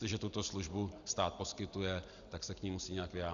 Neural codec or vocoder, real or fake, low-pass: none; real; 7.2 kHz